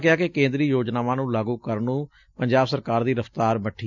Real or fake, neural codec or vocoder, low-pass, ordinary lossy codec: real; none; 7.2 kHz; none